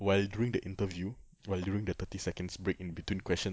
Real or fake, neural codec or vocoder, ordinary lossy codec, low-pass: real; none; none; none